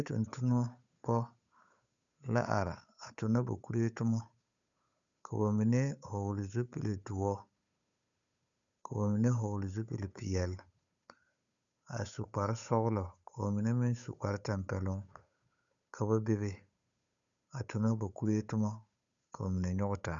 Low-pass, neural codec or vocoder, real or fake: 7.2 kHz; codec, 16 kHz, 8 kbps, FunCodec, trained on Chinese and English, 25 frames a second; fake